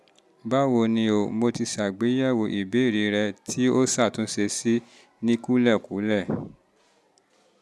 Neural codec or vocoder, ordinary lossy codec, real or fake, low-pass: none; none; real; none